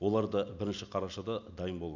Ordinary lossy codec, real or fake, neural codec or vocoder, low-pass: none; real; none; 7.2 kHz